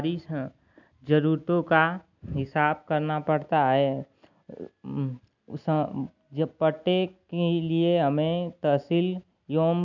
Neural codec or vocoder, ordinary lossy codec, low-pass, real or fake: none; none; 7.2 kHz; real